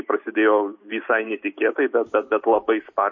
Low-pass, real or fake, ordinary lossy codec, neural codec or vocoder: 7.2 kHz; real; MP3, 32 kbps; none